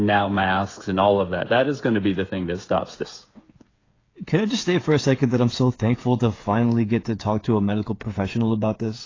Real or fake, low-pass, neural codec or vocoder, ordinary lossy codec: fake; 7.2 kHz; codec, 16 kHz, 8 kbps, FreqCodec, smaller model; AAC, 32 kbps